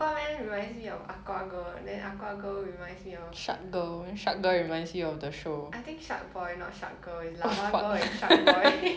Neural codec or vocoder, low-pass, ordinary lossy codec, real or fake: none; none; none; real